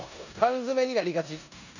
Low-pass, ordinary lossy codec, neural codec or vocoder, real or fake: 7.2 kHz; none; codec, 16 kHz in and 24 kHz out, 0.9 kbps, LongCat-Audio-Codec, four codebook decoder; fake